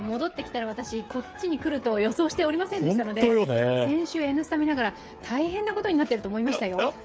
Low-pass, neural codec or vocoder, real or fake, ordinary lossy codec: none; codec, 16 kHz, 8 kbps, FreqCodec, smaller model; fake; none